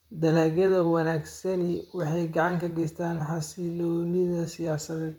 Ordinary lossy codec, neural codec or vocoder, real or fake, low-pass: MP3, 96 kbps; vocoder, 44.1 kHz, 128 mel bands, Pupu-Vocoder; fake; 19.8 kHz